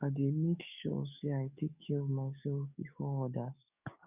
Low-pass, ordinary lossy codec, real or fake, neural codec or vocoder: 3.6 kHz; none; fake; codec, 44.1 kHz, 7.8 kbps, DAC